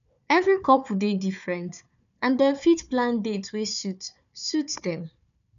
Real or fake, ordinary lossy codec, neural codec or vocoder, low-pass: fake; none; codec, 16 kHz, 4 kbps, FunCodec, trained on Chinese and English, 50 frames a second; 7.2 kHz